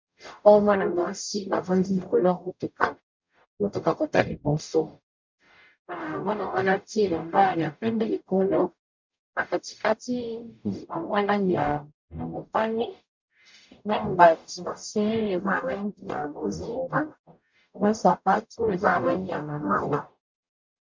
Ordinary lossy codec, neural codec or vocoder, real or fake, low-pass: MP3, 64 kbps; codec, 44.1 kHz, 0.9 kbps, DAC; fake; 7.2 kHz